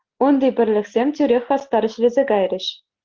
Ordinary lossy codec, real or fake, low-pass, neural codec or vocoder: Opus, 16 kbps; real; 7.2 kHz; none